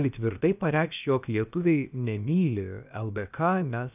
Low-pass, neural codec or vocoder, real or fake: 3.6 kHz; codec, 16 kHz, about 1 kbps, DyCAST, with the encoder's durations; fake